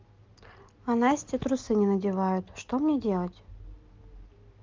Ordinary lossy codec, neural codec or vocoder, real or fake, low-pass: Opus, 24 kbps; none; real; 7.2 kHz